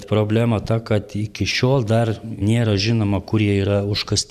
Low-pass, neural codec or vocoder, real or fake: 14.4 kHz; vocoder, 44.1 kHz, 128 mel bands every 512 samples, BigVGAN v2; fake